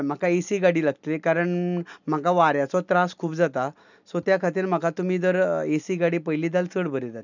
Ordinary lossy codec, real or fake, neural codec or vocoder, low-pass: none; real; none; 7.2 kHz